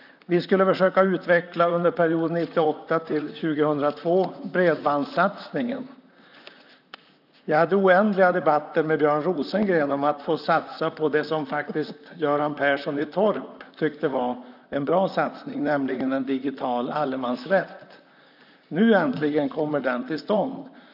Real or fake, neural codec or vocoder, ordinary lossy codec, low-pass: fake; vocoder, 44.1 kHz, 128 mel bands, Pupu-Vocoder; none; 5.4 kHz